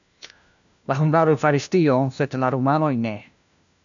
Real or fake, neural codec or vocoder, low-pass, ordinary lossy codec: fake; codec, 16 kHz, 1 kbps, FunCodec, trained on LibriTTS, 50 frames a second; 7.2 kHz; MP3, 96 kbps